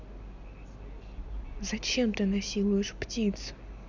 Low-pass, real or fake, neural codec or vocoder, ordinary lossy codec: 7.2 kHz; real; none; none